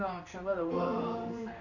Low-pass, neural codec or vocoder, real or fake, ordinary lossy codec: 7.2 kHz; codec, 16 kHz in and 24 kHz out, 1 kbps, XY-Tokenizer; fake; none